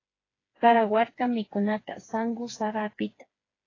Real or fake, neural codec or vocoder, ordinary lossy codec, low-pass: fake; codec, 16 kHz, 4 kbps, FreqCodec, smaller model; AAC, 32 kbps; 7.2 kHz